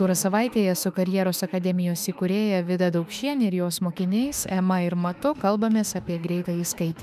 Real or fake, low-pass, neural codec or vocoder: fake; 14.4 kHz; autoencoder, 48 kHz, 32 numbers a frame, DAC-VAE, trained on Japanese speech